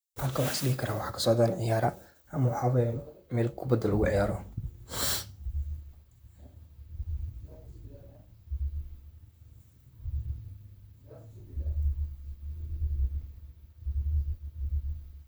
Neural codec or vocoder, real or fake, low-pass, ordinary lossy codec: vocoder, 44.1 kHz, 128 mel bands, Pupu-Vocoder; fake; none; none